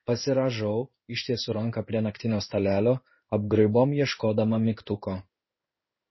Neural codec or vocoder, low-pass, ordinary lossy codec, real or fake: codec, 16 kHz in and 24 kHz out, 1 kbps, XY-Tokenizer; 7.2 kHz; MP3, 24 kbps; fake